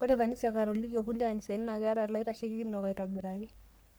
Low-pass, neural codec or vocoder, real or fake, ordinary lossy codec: none; codec, 44.1 kHz, 3.4 kbps, Pupu-Codec; fake; none